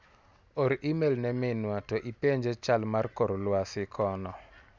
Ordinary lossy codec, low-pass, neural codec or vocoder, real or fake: none; none; none; real